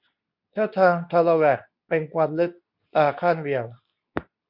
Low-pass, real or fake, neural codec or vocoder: 5.4 kHz; fake; codec, 24 kHz, 0.9 kbps, WavTokenizer, medium speech release version 2